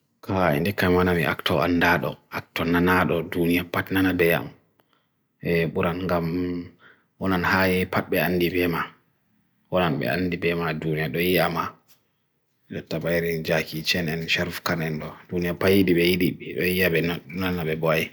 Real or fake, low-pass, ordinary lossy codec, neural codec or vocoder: real; none; none; none